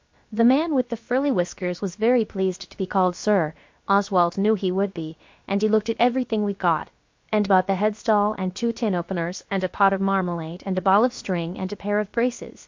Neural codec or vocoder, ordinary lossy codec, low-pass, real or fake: codec, 16 kHz, about 1 kbps, DyCAST, with the encoder's durations; MP3, 48 kbps; 7.2 kHz; fake